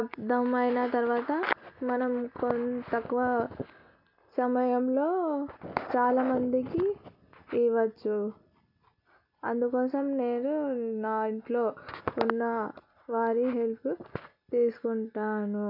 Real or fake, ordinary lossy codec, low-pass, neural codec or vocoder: real; none; 5.4 kHz; none